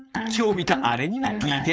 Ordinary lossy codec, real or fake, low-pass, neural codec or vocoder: none; fake; none; codec, 16 kHz, 4.8 kbps, FACodec